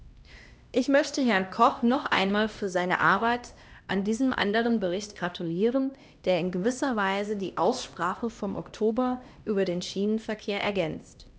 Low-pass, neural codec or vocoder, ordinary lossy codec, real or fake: none; codec, 16 kHz, 1 kbps, X-Codec, HuBERT features, trained on LibriSpeech; none; fake